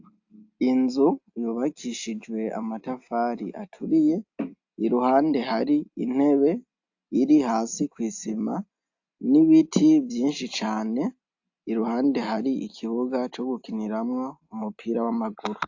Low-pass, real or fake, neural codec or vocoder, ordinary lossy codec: 7.2 kHz; real; none; AAC, 48 kbps